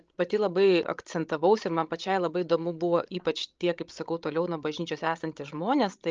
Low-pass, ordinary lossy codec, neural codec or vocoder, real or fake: 7.2 kHz; Opus, 24 kbps; codec, 16 kHz, 16 kbps, FreqCodec, larger model; fake